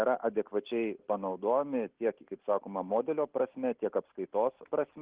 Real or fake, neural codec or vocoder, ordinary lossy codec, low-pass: real; none; Opus, 16 kbps; 3.6 kHz